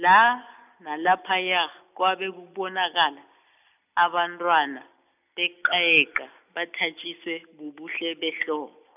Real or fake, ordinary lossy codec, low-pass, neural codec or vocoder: real; none; 3.6 kHz; none